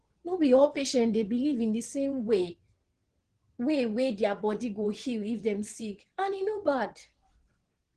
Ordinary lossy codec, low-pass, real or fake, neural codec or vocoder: Opus, 16 kbps; 9.9 kHz; fake; vocoder, 22.05 kHz, 80 mel bands, WaveNeXt